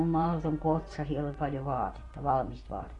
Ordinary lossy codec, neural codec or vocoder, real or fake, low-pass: AAC, 32 kbps; vocoder, 24 kHz, 100 mel bands, Vocos; fake; 10.8 kHz